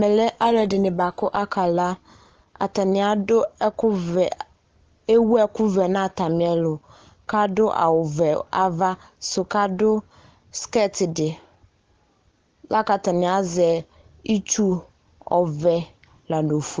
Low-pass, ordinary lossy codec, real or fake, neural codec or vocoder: 7.2 kHz; Opus, 16 kbps; real; none